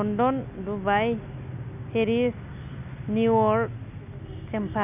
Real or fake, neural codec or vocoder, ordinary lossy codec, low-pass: real; none; none; 3.6 kHz